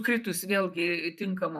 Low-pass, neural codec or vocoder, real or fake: 14.4 kHz; vocoder, 44.1 kHz, 128 mel bands, Pupu-Vocoder; fake